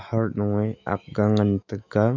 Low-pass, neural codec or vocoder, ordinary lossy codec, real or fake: 7.2 kHz; autoencoder, 48 kHz, 128 numbers a frame, DAC-VAE, trained on Japanese speech; none; fake